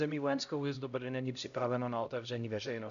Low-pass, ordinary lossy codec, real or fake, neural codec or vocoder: 7.2 kHz; MP3, 96 kbps; fake; codec, 16 kHz, 0.5 kbps, X-Codec, HuBERT features, trained on LibriSpeech